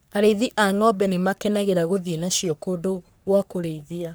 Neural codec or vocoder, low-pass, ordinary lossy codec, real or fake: codec, 44.1 kHz, 3.4 kbps, Pupu-Codec; none; none; fake